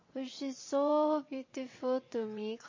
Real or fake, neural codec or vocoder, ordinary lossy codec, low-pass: fake; vocoder, 22.05 kHz, 80 mel bands, WaveNeXt; MP3, 32 kbps; 7.2 kHz